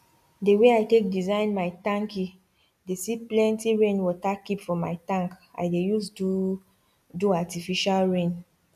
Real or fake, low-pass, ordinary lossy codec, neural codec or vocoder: real; 14.4 kHz; none; none